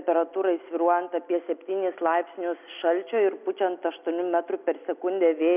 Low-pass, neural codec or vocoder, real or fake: 3.6 kHz; none; real